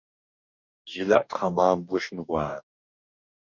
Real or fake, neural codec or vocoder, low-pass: fake; codec, 44.1 kHz, 2.6 kbps, DAC; 7.2 kHz